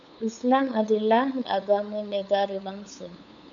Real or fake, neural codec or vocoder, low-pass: fake; codec, 16 kHz, 8 kbps, FunCodec, trained on LibriTTS, 25 frames a second; 7.2 kHz